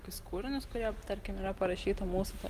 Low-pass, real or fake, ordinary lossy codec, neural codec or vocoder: 14.4 kHz; real; Opus, 24 kbps; none